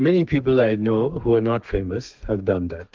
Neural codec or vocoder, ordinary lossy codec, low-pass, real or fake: codec, 32 kHz, 1.9 kbps, SNAC; Opus, 32 kbps; 7.2 kHz; fake